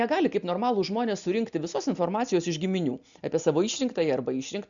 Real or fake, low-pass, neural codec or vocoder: real; 7.2 kHz; none